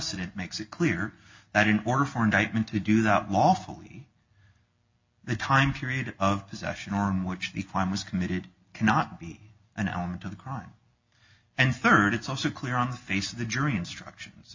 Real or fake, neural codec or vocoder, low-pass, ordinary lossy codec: real; none; 7.2 kHz; MP3, 48 kbps